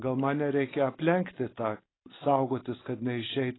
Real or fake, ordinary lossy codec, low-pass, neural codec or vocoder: real; AAC, 16 kbps; 7.2 kHz; none